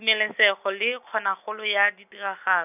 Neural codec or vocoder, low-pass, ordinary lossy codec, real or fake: none; 3.6 kHz; none; real